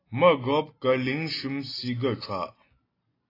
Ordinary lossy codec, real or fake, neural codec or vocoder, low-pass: AAC, 24 kbps; real; none; 5.4 kHz